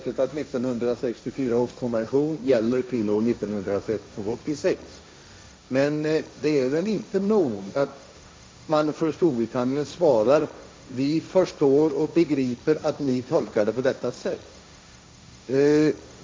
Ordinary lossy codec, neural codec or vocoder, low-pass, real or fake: none; codec, 16 kHz, 1.1 kbps, Voila-Tokenizer; none; fake